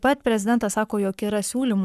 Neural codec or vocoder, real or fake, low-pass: codec, 44.1 kHz, 7.8 kbps, Pupu-Codec; fake; 14.4 kHz